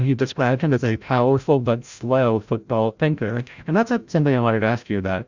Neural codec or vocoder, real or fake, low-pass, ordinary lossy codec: codec, 16 kHz, 0.5 kbps, FreqCodec, larger model; fake; 7.2 kHz; Opus, 64 kbps